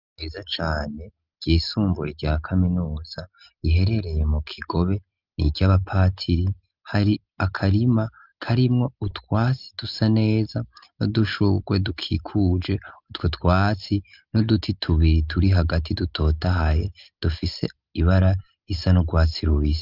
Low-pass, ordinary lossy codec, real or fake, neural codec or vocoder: 5.4 kHz; Opus, 24 kbps; real; none